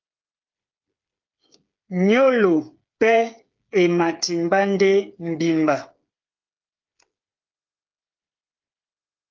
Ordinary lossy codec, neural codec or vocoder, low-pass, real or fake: Opus, 24 kbps; codec, 16 kHz, 8 kbps, FreqCodec, smaller model; 7.2 kHz; fake